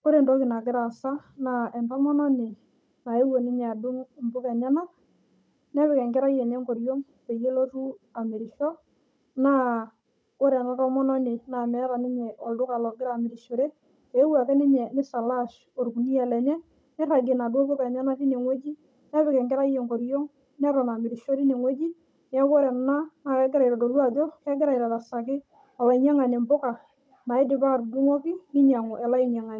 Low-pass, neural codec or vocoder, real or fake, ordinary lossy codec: none; codec, 16 kHz, 16 kbps, FunCodec, trained on Chinese and English, 50 frames a second; fake; none